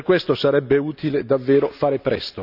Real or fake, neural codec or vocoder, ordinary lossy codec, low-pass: real; none; none; 5.4 kHz